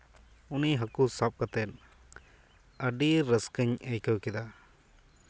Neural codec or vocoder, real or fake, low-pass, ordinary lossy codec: none; real; none; none